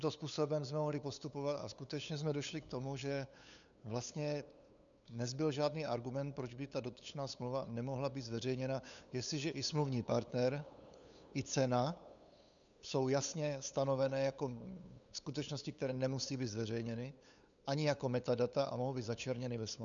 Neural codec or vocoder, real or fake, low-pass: codec, 16 kHz, 8 kbps, FunCodec, trained on LibriTTS, 25 frames a second; fake; 7.2 kHz